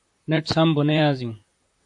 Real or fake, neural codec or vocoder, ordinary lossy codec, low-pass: fake; vocoder, 44.1 kHz, 128 mel bands, Pupu-Vocoder; AAC, 64 kbps; 10.8 kHz